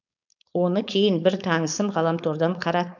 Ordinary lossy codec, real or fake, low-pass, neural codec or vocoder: none; fake; 7.2 kHz; codec, 16 kHz, 4.8 kbps, FACodec